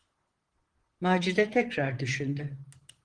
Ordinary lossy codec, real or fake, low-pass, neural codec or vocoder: Opus, 16 kbps; fake; 9.9 kHz; vocoder, 44.1 kHz, 128 mel bands, Pupu-Vocoder